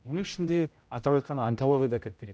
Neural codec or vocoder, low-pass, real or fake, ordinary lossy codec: codec, 16 kHz, 0.5 kbps, X-Codec, HuBERT features, trained on general audio; none; fake; none